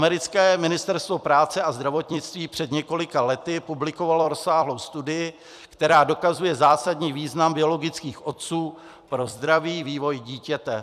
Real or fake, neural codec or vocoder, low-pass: fake; vocoder, 44.1 kHz, 128 mel bands every 256 samples, BigVGAN v2; 14.4 kHz